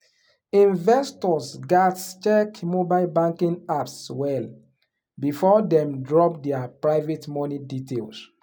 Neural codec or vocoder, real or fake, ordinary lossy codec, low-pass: none; real; none; none